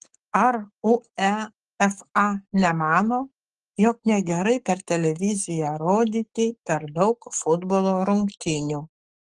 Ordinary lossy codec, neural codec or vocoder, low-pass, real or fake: Opus, 24 kbps; codec, 44.1 kHz, 7.8 kbps, Pupu-Codec; 10.8 kHz; fake